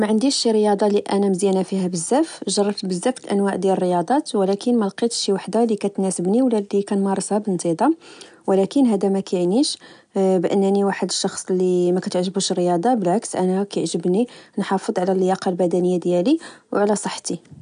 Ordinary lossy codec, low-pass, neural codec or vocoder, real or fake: none; 9.9 kHz; none; real